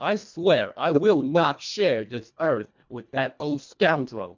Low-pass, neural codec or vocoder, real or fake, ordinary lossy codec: 7.2 kHz; codec, 24 kHz, 1.5 kbps, HILCodec; fake; MP3, 64 kbps